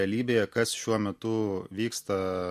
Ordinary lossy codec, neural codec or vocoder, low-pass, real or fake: MP3, 64 kbps; none; 14.4 kHz; real